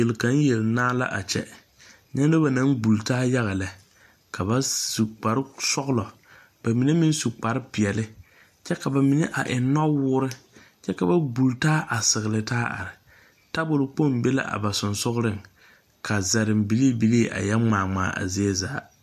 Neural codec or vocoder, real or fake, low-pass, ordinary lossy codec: none; real; 14.4 kHz; AAC, 96 kbps